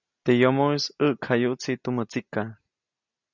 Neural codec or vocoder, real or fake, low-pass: none; real; 7.2 kHz